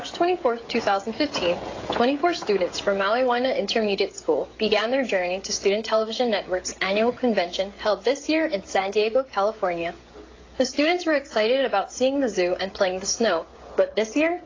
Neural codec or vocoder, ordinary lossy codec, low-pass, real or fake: codec, 16 kHz, 16 kbps, FreqCodec, smaller model; AAC, 32 kbps; 7.2 kHz; fake